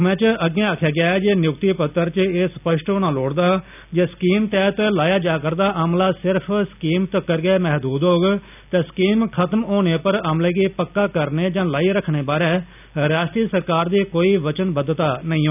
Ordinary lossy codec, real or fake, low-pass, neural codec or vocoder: none; real; 3.6 kHz; none